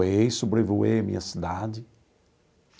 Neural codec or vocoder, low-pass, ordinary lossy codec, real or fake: none; none; none; real